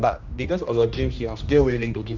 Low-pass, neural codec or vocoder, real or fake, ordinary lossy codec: 7.2 kHz; codec, 16 kHz, 1 kbps, X-Codec, HuBERT features, trained on general audio; fake; none